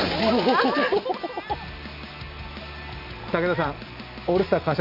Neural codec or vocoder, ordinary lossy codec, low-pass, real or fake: none; none; 5.4 kHz; real